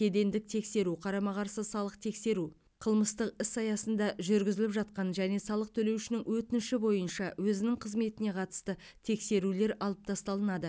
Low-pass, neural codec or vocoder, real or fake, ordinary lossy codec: none; none; real; none